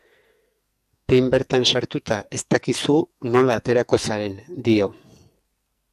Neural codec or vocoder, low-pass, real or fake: codec, 44.1 kHz, 2.6 kbps, SNAC; 14.4 kHz; fake